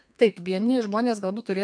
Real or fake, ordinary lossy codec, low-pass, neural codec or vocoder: fake; MP3, 64 kbps; 9.9 kHz; codec, 32 kHz, 1.9 kbps, SNAC